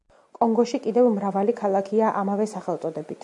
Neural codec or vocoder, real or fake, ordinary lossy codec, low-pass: none; real; MP3, 64 kbps; 10.8 kHz